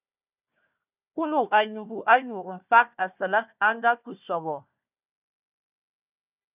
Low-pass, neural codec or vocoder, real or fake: 3.6 kHz; codec, 16 kHz, 1 kbps, FunCodec, trained on Chinese and English, 50 frames a second; fake